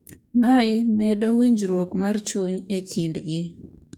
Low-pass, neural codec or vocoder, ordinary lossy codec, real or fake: 19.8 kHz; codec, 44.1 kHz, 2.6 kbps, DAC; none; fake